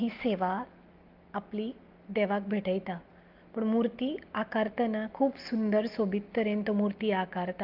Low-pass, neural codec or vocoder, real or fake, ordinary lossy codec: 5.4 kHz; none; real; Opus, 32 kbps